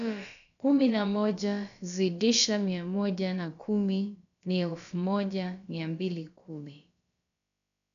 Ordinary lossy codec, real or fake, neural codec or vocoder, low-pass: none; fake; codec, 16 kHz, about 1 kbps, DyCAST, with the encoder's durations; 7.2 kHz